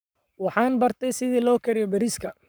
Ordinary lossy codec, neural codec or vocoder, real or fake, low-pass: none; codec, 44.1 kHz, 7.8 kbps, Pupu-Codec; fake; none